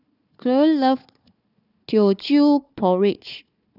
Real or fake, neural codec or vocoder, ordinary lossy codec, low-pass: fake; codec, 16 kHz, 4 kbps, FunCodec, trained on Chinese and English, 50 frames a second; none; 5.4 kHz